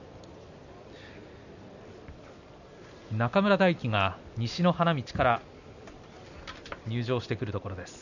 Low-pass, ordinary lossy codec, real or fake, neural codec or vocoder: 7.2 kHz; none; real; none